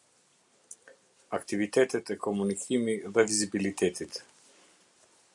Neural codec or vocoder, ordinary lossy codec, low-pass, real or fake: none; MP3, 96 kbps; 10.8 kHz; real